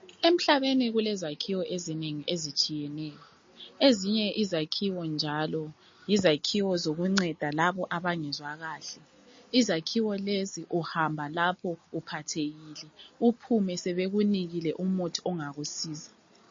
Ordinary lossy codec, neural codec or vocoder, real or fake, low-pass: MP3, 32 kbps; none; real; 7.2 kHz